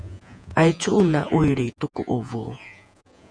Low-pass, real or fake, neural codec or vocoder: 9.9 kHz; fake; vocoder, 48 kHz, 128 mel bands, Vocos